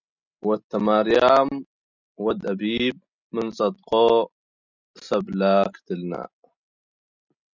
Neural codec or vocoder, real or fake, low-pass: none; real; 7.2 kHz